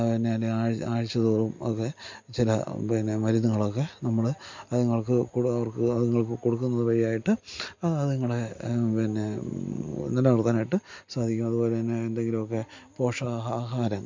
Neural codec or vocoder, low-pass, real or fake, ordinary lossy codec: none; 7.2 kHz; real; MP3, 48 kbps